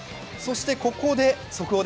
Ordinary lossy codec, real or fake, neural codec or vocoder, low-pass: none; real; none; none